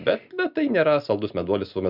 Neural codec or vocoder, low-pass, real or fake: none; 5.4 kHz; real